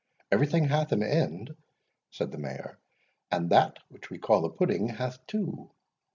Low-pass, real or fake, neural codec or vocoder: 7.2 kHz; real; none